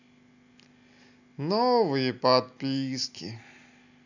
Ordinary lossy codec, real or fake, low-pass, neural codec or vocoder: none; real; 7.2 kHz; none